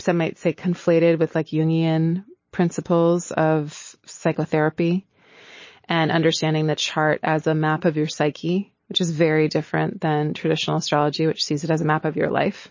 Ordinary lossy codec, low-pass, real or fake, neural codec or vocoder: MP3, 32 kbps; 7.2 kHz; real; none